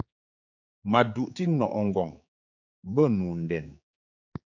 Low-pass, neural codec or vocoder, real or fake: 7.2 kHz; codec, 16 kHz, 4 kbps, X-Codec, HuBERT features, trained on general audio; fake